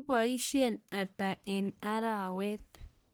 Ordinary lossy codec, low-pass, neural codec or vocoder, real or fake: none; none; codec, 44.1 kHz, 1.7 kbps, Pupu-Codec; fake